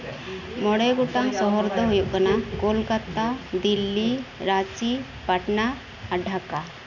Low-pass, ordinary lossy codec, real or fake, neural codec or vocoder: 7.2 kHz; none; real; none